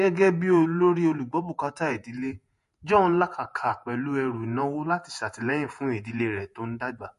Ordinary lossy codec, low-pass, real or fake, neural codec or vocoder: MP3, 48 kbps; 14.4 kHz; fake; vocoder, 48 kHz, 128 mel bands, Vocos